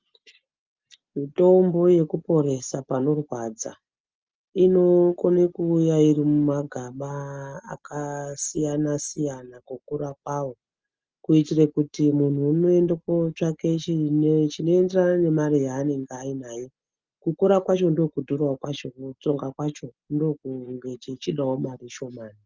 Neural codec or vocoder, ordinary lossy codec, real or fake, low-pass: none; Opus, 24 kbps; real; 7.2 kHz